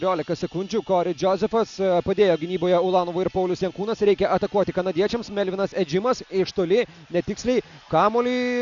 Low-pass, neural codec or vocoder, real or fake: 7.2 kHz; none; real